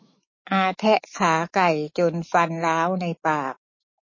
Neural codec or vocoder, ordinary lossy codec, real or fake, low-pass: autoencoder, 48 kHz, 128 numbers a frame, DAC-VAE, trained on Japanese speech; MP3, 32 kbps; fake; 7.2 kHz